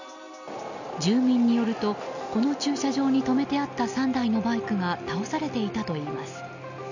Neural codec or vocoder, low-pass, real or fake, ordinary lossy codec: none; 7.2 kHz; real; none